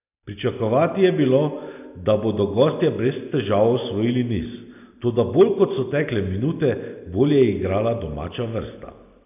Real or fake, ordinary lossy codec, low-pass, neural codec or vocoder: real; none; 3.6 kHz; none